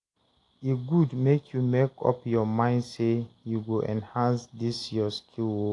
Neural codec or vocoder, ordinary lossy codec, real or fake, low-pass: none; AAC, 48 kbps; real; 10.8 kHz